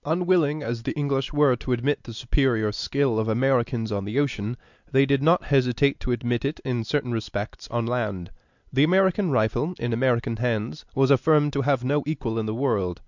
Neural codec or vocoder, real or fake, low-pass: none; real; 7.2 kHz